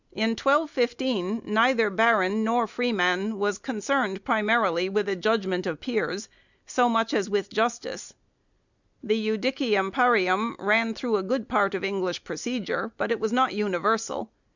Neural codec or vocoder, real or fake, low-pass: none; real; 7.2 kHz